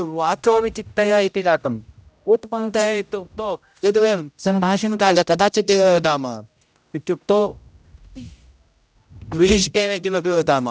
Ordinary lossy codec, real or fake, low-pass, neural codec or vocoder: none; fake; none; codec, 16 kHz, 0.5 kbps, X-Codec, HuBERT features, trained on general audio